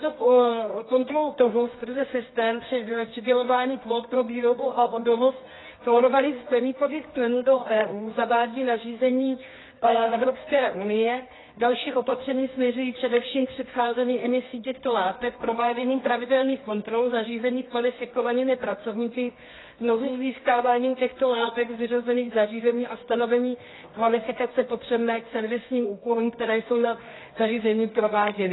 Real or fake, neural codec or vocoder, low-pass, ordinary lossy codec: fake; codec, 24 kHz, 0.9 kbps, WavTokenizer, medium music audio release; 7.2 kHz; AAC, 16 kbps